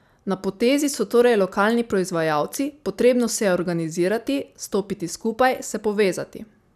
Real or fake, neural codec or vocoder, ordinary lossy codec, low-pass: real; none; none; 14.4 kHz